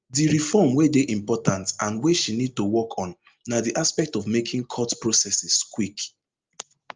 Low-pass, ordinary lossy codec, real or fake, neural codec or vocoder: 7.2 kHz; Opus, 24 kbps; real; none